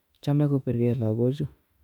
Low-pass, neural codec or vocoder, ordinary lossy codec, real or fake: 19.8 kHz; autoencoder, 48 kHz, 32 numbers a frame, DAC-VAE, trained on Japanese speech; none; fake